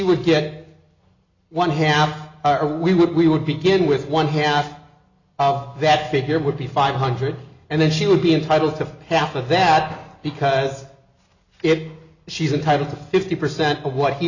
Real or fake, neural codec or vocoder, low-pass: real; none; 7.2 kHz